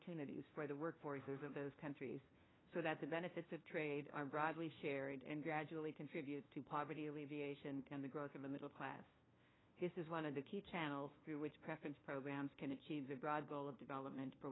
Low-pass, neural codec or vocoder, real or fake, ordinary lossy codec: 7.2 kHz; codec, 16 kHz, 1 kbps, FunCodec, trained on LibriTTS, 50 frames a second; fake; AAC, 16 kbps